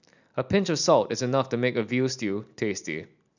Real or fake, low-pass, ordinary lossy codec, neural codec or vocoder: real; 7.2 kHz; none; none